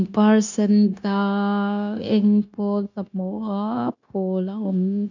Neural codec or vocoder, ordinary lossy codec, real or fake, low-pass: codec, 16 kHz, 0.9 kbps, LongCat-Audio-Codec; none; fake; 7.2 kHz